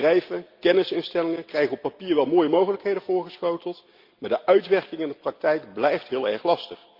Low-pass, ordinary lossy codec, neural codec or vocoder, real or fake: 5.4 kHz; Opus, 24 kbps; none; real